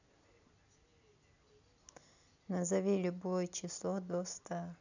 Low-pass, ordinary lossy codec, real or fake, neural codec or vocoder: 7.2 kHz; none; real; none